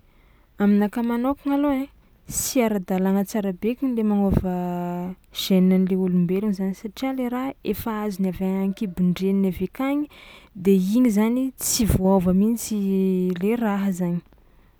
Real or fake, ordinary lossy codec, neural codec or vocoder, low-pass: real; none; none; none